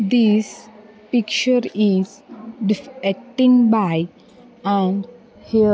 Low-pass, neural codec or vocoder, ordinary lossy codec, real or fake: none; none; none; real